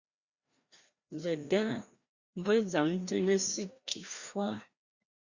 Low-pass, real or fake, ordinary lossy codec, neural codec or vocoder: 7.2 kHz; fake; Opus, 64 kbps; codec, 16 kHz, 1 kbps, FreqCodec, larger model